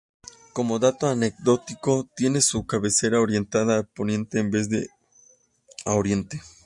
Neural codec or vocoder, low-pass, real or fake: none; 10.8 kHz; real